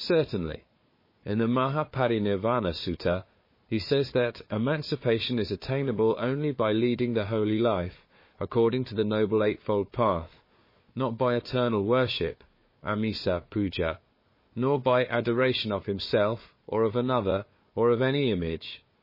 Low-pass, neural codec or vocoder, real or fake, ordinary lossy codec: 5.4 kHz; codec, 16 kHz, 4 kbps, FunCodec, trained on Chinese and English, 50 frames a second; fake; MP3, 24 kbps